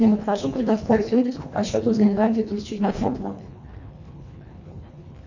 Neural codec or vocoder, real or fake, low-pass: codec, 24 kHz, 1.5 kbps, HILCodec; fake; 7.2 kHz